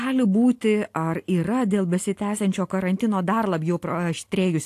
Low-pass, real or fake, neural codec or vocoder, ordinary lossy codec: 14.4 kHz; real; none; AAC, 64 kbps